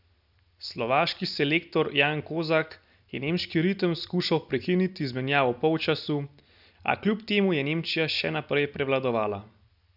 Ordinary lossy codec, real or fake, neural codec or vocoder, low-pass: none; real; none; 5.4 kHz